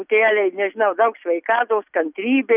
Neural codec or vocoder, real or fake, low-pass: none; real; 3.6 kHz